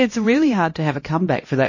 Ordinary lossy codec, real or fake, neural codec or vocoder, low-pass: MP3, 32 kbps; fake; codec, 16 kHz, 1 kbps, X-Codec, HuBERT features, trained on LibriSpeech; 7.2 kHz